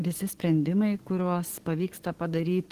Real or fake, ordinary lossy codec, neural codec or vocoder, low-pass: fake; Opus, 16 kbps; codec, 44.1 kHz, 7.8 kbps, DAC; 14.4 kHz